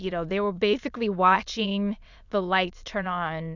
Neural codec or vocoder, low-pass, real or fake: autoencoder, 22.05 kHz, a latent of 192 numbers a frame, VITS, trained on many speakers; 7.2 kHz; fake